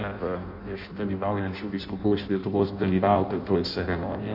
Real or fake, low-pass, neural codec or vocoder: fake; 5.4 kHz; codec, 16 kHz in and 24 kHz out, 0.6 kbps, FireRedTTS-2 codec